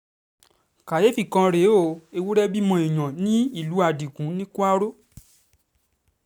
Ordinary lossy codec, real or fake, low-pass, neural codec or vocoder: none; real; none; none